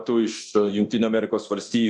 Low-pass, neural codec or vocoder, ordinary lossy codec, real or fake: 10.8 kHz; codec, 24 kHz, 0.9 kbps, DualCodec; MP3, 64 kbps; fake